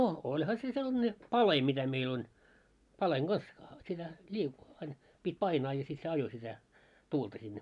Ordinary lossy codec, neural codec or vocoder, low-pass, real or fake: none; none; 10.8 kHz; real